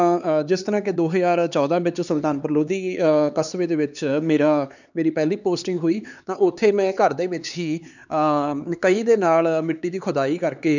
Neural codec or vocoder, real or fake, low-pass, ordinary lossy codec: codec, 16 kHz, 4 kbps, X-Codec, HuBERT features, trained on LibriSpeech; fake; 7.2 kHz; none